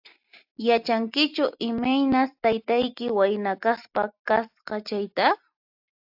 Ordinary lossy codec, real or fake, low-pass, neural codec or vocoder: AAC, 48 kbps; real; 5.4 kHz; none